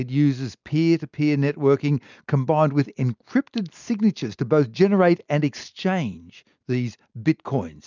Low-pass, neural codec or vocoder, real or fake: 7.2 kHz; none; real